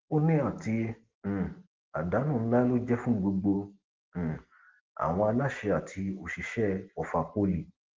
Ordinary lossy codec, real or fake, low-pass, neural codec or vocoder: Opus, 16 kbps; real; 7.2 kHz; none